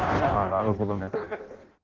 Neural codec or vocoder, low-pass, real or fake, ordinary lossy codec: codec, 16 kHz in and 24 kHz out, 0.6 kbps, FireRedTTS-2 codec; 7.2 kHz; fake; Opus, 16 kbps